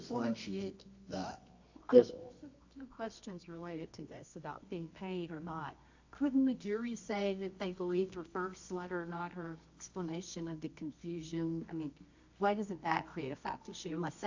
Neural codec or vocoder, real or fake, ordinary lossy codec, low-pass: codec, 24 kHz, 0.9 kbps, WavTokenizer, medium music audio release; fake; AAC, 48 kbps; 7.2 kHz